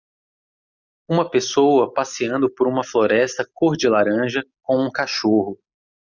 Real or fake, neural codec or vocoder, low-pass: real; none; 7.2 kHz